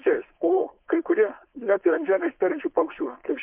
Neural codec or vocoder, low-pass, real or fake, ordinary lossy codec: codec, 16 kHz, 4.8 kbps, FACodec; 3.6 kHz; fake; MP3, 32 kbps